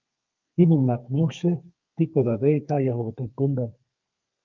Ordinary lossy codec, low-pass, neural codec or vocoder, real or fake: Opus, 32 kbps; 7.2 kHz; codec, 32 kHz, 1.9 kbps, SNAC; fake